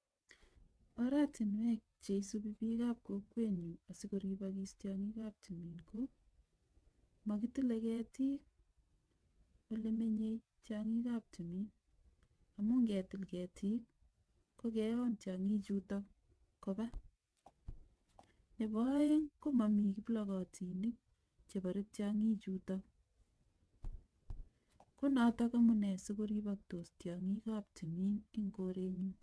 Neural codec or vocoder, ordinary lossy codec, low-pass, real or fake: vocoder, 22.05 kHz, 80 mel bands, WaveNeXt; none; none; fake